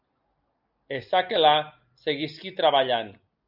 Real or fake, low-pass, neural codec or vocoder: real; 5.4 kHz; none